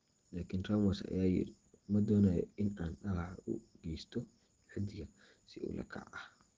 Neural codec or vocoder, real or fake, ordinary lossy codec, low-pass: none; real; Opus, 16 kbps; 7.2 kHz